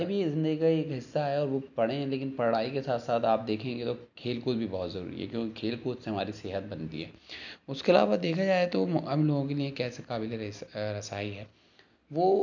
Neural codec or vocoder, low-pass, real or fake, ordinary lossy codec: none; 7.2 kHz; real; none